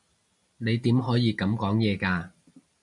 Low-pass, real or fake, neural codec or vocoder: 10.8 kHz; real; none